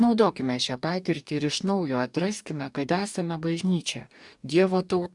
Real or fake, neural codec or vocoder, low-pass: fake; codec, 44.1 kHz, 2.6 kbps, DAC; 10.8 kHz